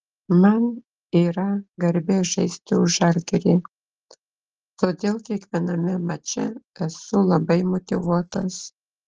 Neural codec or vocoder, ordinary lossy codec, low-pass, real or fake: none; Opus, 16 kbps; 7.2 kHz; real